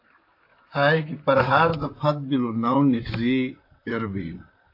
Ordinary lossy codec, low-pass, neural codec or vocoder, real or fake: AAC, 32 kbps; 5.4 kHz; vocoder, 44.1 kHz, 128 mel bands, Pupu-Vocoder; fake